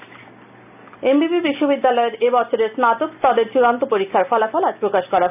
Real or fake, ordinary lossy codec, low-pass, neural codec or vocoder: real; none; 3.6 kHz; none